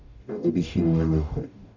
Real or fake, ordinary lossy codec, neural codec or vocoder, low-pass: fake; none; codec, 44.1 kHz, 0.9 kbps, DAC; 7.2 kHz